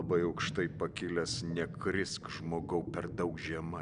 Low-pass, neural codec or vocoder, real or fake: 9.9 kHz; none; real